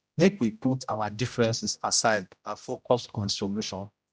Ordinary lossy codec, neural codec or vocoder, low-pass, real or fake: none; codec, 16 kHz, 0.5 kbps, X-Codec, HuBERT features, trained on general audio; none; fake